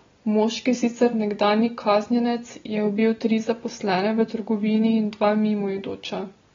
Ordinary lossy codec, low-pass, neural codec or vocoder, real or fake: AAC, 24 kbps; 7.2 kHz; none; real